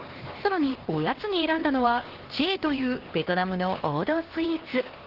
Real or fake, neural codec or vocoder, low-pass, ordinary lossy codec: fake; codec, 16 kHz, 2 kbps, X-Codec, HuBERT features, trained on LibriSpeech; 5.4 kHz; Opus, 16 kbps